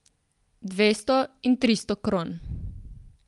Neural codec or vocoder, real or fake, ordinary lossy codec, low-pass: none; real; Opus, 32 kbps; 10.8 kHz